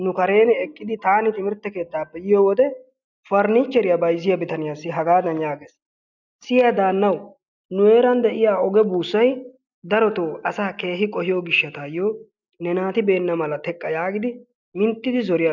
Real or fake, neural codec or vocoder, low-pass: real; none; 7.2 kHz